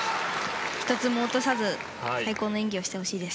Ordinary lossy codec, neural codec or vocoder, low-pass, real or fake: none; none; none; real